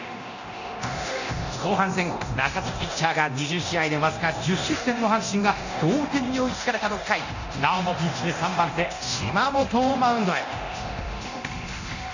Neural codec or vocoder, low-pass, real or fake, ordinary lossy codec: codec, 24 kHz, 0.9 kbps, DualCodec; 7.2 kHz; fake; none